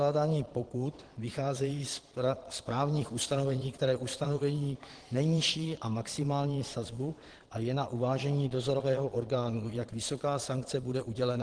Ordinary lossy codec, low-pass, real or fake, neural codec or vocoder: Opus, 16 kbps; 9.9 kHz; fake; vocoder, 22.05 kHz, 80 mel bands, Vocos